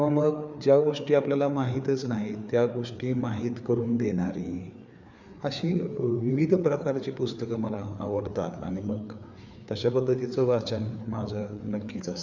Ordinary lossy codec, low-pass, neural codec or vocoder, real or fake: none; 7.2 kHz; codec, 16 kHz, 4 kbps, FreqCodec, larger model; fake